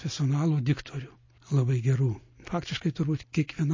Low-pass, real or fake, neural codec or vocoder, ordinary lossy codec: 7.2 kHz; real; none; MP3, 32 kbps